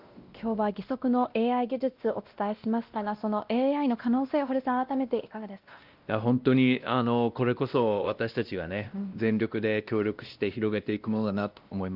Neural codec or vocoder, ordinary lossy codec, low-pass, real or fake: codec, 16 kHz, 1 kbps, X-Codec, WavLM features, trained on Multilingual LibriSpeech; Opus, 32 kbps; 5.4 kHz; fake